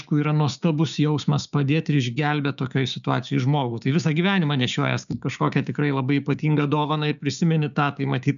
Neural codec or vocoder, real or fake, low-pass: codec, 16 kHz, 6 kbps, DAC; fake; 7.2 kHz